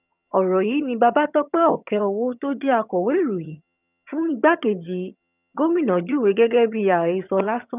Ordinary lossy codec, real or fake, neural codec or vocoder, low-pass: none; fake; vocoder, 22.05 kHz, 80 mel bands, HiFi-GAN; 3.6 kHz